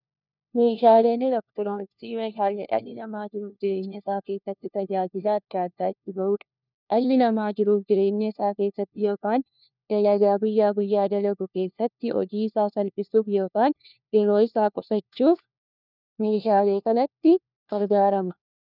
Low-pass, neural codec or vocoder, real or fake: 5.4 kHz; codec, 16 kHz, 1 kbps, FunCodec, trained on LibriTTS, 50 frames a second; fake